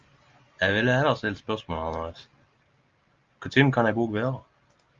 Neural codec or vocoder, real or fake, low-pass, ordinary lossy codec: none; real; 7.2 kHz; Opus, 32 kbps